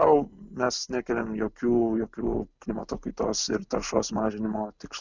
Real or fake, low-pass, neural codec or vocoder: real; 7.2 kHz; none